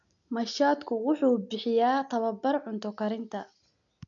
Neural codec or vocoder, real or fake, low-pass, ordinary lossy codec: none; real; 7.2 kHz; none